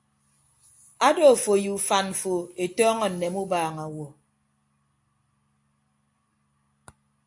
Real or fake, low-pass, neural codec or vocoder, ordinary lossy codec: real; 10.8 kHz; none; AAC, 64 kbps